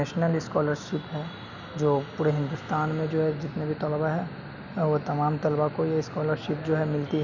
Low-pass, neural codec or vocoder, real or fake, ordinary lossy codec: 7.2 kHz; none; real; none